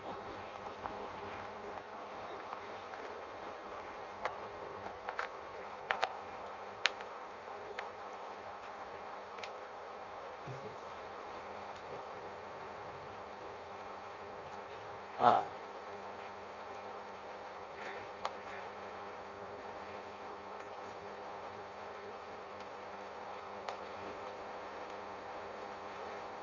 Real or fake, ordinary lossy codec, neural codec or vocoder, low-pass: fake; Opus, 64 kbps; codec, 16 kHz in and 24 kHz out, 0.6 kbps, FireRedTTS-2 codec; 7.2 kHz